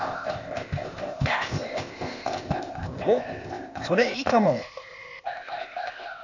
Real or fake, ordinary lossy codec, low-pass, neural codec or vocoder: fake; none; 7.2 kHz; codec, 16 kHz, 0.8 kbps, ZipCodec